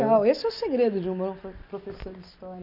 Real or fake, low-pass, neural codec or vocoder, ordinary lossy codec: real; 5.4 kHz; none; none